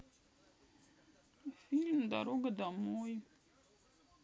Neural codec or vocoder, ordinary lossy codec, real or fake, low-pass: none; none; real; none